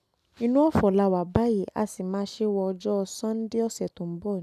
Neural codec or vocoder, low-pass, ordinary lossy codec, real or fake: none; 14.4 kHz; none; real